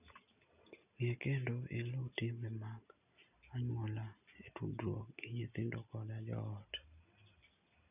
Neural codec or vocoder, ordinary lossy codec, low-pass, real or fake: none; none; 3.6 kHz; real